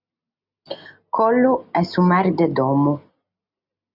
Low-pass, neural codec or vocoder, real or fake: 5.4 kHz; none; real